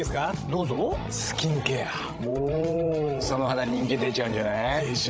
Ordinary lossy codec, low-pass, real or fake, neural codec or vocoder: none; none; fake; codec, 16 kHz, 16 kbps, FreqCodec, larger model